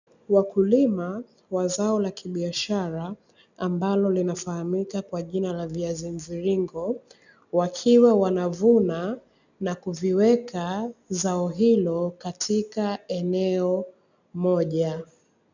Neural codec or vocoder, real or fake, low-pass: none; real; 7.2 kHz